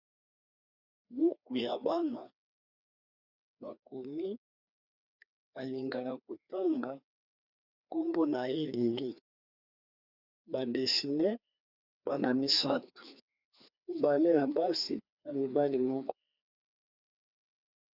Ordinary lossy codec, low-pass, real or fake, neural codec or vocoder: Opus, 64 kbps; 5.4 kHz; fake; codec, 16 kHz, 2 kbps, FreqCodec, larger model